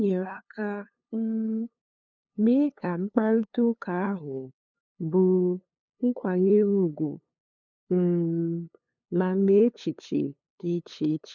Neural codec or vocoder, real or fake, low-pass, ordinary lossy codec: codec, 16 kHz, 2 kbps, FunCodec, trained on LibriTTS, 25 frames a second; fake; 7.2 kHz; none